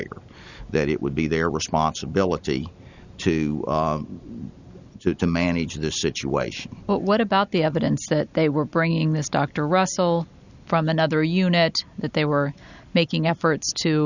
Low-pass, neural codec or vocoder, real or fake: 7.2 kHz; none; real